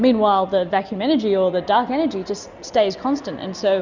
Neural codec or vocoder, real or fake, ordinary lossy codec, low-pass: none; real; Opus, 64 kbps; 7.2 kHz